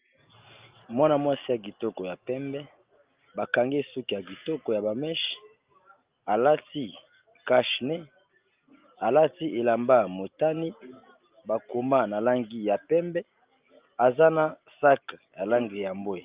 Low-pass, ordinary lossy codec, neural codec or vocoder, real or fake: 3.6 kHz; Opus, 24 kbps; none; real